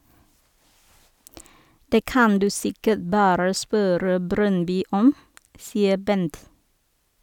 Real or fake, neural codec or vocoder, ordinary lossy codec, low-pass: real; none; none; 19.8 kHz